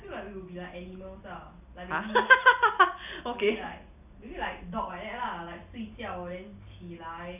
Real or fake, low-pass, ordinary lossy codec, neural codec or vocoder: real; 3.6 kHz; none; none